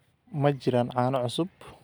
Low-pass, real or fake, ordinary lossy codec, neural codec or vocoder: none; real; none; none